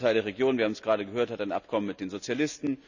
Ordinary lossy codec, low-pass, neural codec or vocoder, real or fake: none; 7.2 kHz; none; real